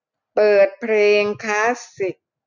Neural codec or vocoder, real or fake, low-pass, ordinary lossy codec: none; real; 7.2 kHz; none